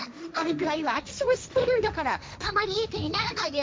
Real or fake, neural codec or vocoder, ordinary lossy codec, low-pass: fake; codec, 16 kHz, 1.1 kbps, Voila-Tokenizer; none; none